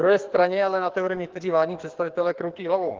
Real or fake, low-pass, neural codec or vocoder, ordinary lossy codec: fake; 7.2 kHz; codec, 44.1 kHz, 2.6 kbps, SNAC; Opus, 16 kbps